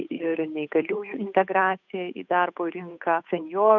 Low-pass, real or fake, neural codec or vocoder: 7.2 kHz; fake; codec, 16 kHz, 8 kbps, FunCodec, trained on Chinese and English, 25 frames a second